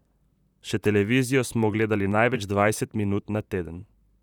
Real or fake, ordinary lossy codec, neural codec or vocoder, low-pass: fake; none; vocoder, 44.1 kHz, 128 mel bands, Pupu-Vocoder; 19.8 kHz